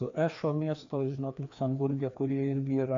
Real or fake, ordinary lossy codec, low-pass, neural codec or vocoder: fake; MP3, 64 kbps; 7.2 kHz; codec, 16 kHz, 2 kbps, FreqCodec, larger model